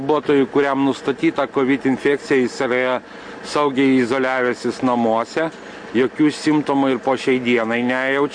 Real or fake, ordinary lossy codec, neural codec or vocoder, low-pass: real; MP3, 48 kbps; none; 9.9 kHz